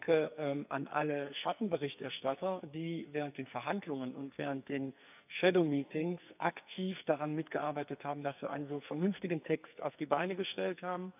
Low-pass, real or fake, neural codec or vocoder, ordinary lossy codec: 3.6 kHz; fake; codec, 44.1 kHz, 2.6 kbps, SNAC; none